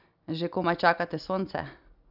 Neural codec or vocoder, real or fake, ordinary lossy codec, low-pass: none; real; none; 5.4 kHz